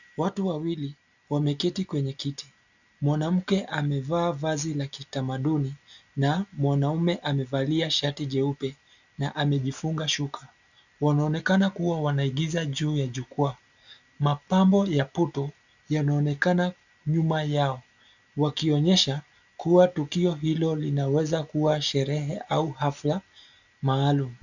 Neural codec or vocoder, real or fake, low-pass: none; real; 7.2 kHz